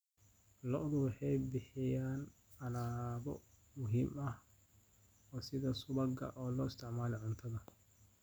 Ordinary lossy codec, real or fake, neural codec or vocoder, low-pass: none; real; none; none